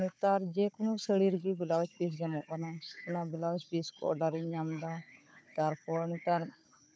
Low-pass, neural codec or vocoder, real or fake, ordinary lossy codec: none; codec, 16 kHz, 4 kbps, FunCodec, trained on Chinese and English, 50 frames a second; fake; none